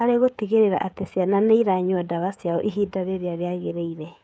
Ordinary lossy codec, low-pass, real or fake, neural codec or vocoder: none; none; fake; codec, 16 kHz, 16 kbps, FreqCodec, smaller model